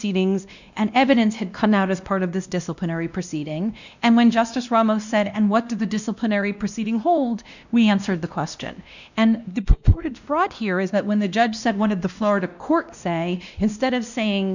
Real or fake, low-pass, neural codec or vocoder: fake; 7.2 kHz; codec, 16 kHz, 1 kbps, X-Codec, WavLM features, trained on Multilingual LibriSpeech